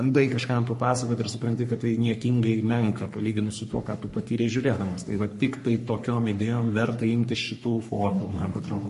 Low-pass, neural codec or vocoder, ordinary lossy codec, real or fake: 14.4 kHz; codec, 44.1 kHz, 3.4 kbps, Pupu-Codec; MP3, 48 kbps; fake